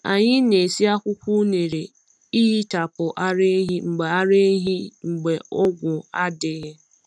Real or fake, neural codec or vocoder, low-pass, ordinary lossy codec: real; none; none; none